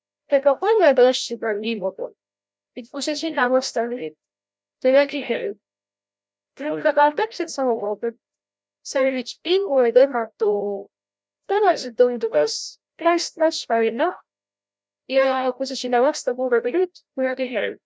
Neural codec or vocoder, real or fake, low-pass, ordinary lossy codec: codec, 16 kHz, 0.5 kbps, FreqCodec, larger model; fake; none; none